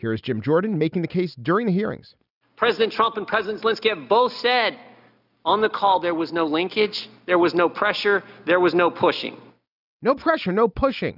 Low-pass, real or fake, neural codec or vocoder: 5.4 kHz; real; none